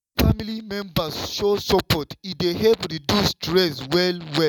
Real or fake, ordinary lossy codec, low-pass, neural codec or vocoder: real; none; 19.8 kHz; none